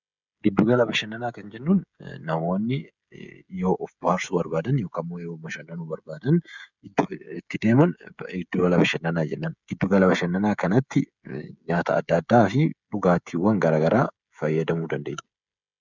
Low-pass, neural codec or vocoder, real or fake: 7.2 kHz; codec, 16 kHz, 16 kbps, FreqCodec, smaller model; fake